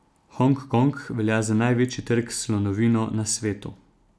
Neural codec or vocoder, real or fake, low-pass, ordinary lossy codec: none; real; none; none